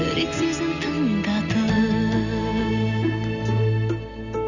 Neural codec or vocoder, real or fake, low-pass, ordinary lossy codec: none; real; 7.2 kHz; none